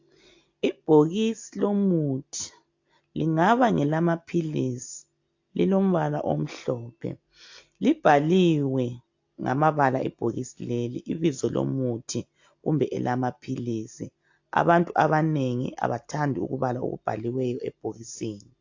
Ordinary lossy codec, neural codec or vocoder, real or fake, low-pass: AAC, 48 kbps; none; real; 7.2 kHz